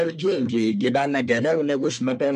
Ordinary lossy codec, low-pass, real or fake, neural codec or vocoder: MP3, 96 kbps; 10.8 kHz; fake; codec, 24 kHz, 1 kbps, SNAC